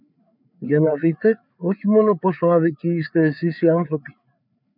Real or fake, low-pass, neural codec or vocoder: fake; 5.4 kHz; codec, 16 kHz, 4 kbps, FreqCodec, larger model